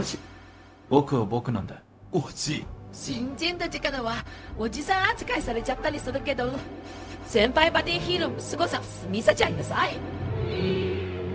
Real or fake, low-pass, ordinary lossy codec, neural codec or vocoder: fake; none; none; codec, 16 kHz, 0.4 kbps, LongCat-Audio-Codec